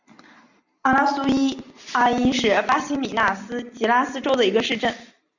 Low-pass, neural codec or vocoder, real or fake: 7.2 kHz; none; real